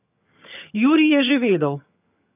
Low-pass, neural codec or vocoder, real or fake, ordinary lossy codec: 3.6 kHz; vocoder, 22.05 kHz, 80 mel bands, HiFi-GAN; fake; none